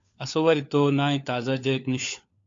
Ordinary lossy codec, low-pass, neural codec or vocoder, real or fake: AAC, 48 kbps; 7.2 kHz; codec, 16 kHz, 4 kbps, FunCodec, trained on LibriTTS, 50 frames a second; fake